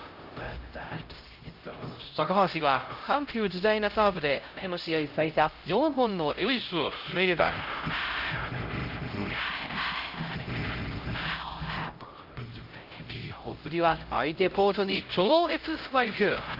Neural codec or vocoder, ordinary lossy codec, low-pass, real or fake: codec, 16 kHz, 0.5 kbps, X-Codec, HuBERT features, trained on LibriSpeech; Opus, 32 kbps; 5.4 kHz; fake